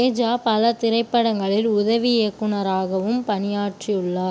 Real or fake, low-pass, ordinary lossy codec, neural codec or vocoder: real; none; none; none